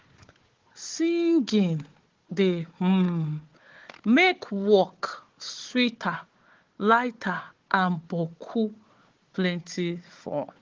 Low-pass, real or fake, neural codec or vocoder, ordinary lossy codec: 7.2 kHz; fake; codec, 16 kHz, 4 kbps, FunCodec, trained on Chinese and English, 50 frames a second; Opus, 16 kbps